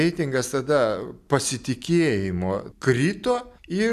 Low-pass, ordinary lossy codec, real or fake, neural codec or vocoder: 14.4 kHz; AAC, 96 kbps; real; none